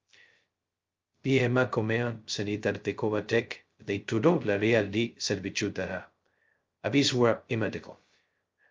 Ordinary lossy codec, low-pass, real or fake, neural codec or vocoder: Opus, 24 kbps; 7.2 kHz; fake; codec, 16 kHz, 0.2 kbps, FocalCodec